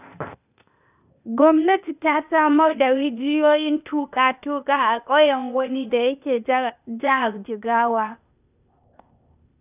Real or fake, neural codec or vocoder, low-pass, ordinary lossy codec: fake; codec, 16 kHz, 0.8 kbps, ZipCodec; 3.6 kHz; none